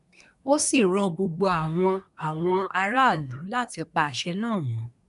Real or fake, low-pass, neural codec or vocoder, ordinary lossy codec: fake; 10.8 kHz; codec, 24 kHz, 1 kbps, SNAC; none